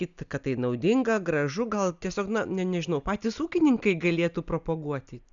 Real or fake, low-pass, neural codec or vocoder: real; 7.2 kHz; none